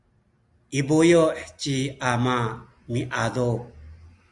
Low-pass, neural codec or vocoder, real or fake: 10.8 kHz; none; real